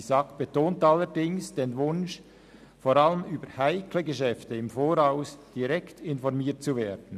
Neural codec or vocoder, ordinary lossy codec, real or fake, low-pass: none; none; real; 14.4 kHz